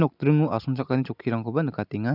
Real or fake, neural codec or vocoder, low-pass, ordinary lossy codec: real; none; 5.4 kHz; none